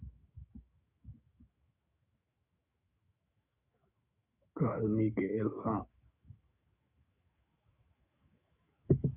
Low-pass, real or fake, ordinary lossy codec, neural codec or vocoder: 3.6 kHz; fake; Opus, 64 kbps; autoencoder, 48 kHz, 128 numbers a frame, DAC-VAE, trained on Japanese speech